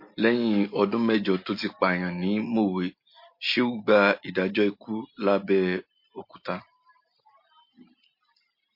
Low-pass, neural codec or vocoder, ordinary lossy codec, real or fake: 5.4 kHz; none; MP3, 32 kbps; real